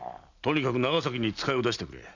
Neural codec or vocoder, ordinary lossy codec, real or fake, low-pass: none; none; real; 7.2 kHz